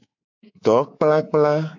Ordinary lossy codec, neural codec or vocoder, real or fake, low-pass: AAC, 48 kbps; codec, 24 kHz, 3.1 kbps, DualCodec; fake; 7.2 kHz